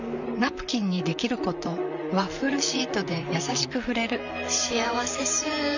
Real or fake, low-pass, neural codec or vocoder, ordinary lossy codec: fake; 7.2 kHz; vocoder, 44.1 kHz, 128 mel bands, Pupu-Vocoder; none